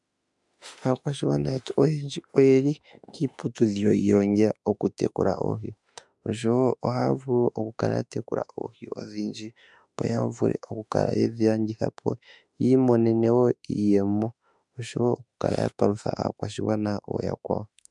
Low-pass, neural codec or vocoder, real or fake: 10.8 kHz; autoencoder, 48 kHz, 32 numbers a frame, DAC-VAE, trained on Japanese speech; fake